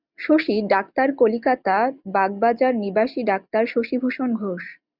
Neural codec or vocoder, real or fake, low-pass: none; real; 5.4 kHz